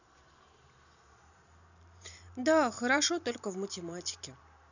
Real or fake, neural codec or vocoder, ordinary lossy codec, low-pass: fake; vocoder, 44.1 kHz, 128 mel bands every 512 samples, BigVGAN v2; none; 7.2 kHz